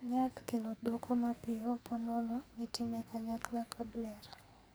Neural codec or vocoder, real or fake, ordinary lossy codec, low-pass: codec, 44.1 kHz, 2.6 kbps, SNAC; fake; none; none